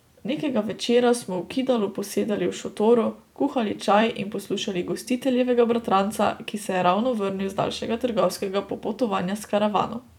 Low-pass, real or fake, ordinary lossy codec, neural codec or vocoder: 19.8 kHz; fake; none; vocoder, 44.1 kHz, 128 mel bands every 256 samples, BigVGAN v2